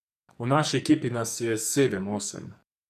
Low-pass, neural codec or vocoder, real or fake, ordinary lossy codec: 14.4 kHz; codec, 44.1 kHz, 2.6 kbps, SNAC; fake; none